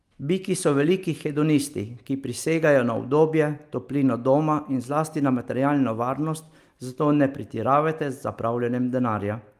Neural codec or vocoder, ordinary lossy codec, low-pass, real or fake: none; Opus, 32 kbps; 14.4 kHz; real